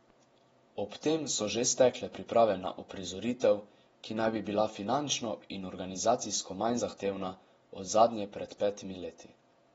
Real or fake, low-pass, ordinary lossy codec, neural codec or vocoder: fake; 19.8 kHz; AAC, 24 kbps; vocoder, 48 kHz, 128 mel bands, Vocos